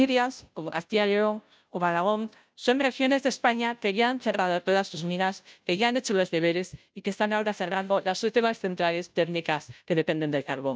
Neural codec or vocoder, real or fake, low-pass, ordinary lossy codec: codec, 16 kHz, 0.5 kbps, FunCodec, trained on Chinese and English, 25 frames a second; fake; none; none